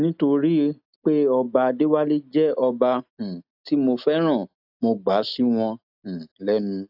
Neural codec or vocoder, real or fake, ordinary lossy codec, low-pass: none; real; AAC, 48 kbps; 5.4 kHz